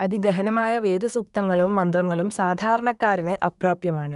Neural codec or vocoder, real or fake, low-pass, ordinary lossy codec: codec, 24 kHz, 1 kbps, SNAC; fake; 10.8 kHz; none